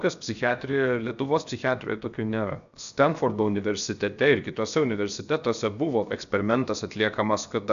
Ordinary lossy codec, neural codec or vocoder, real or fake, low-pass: MP3, 96 kbps; codec, 16 kHz, 0.7 kbps, FocalCodec; fake; 7.2 kHz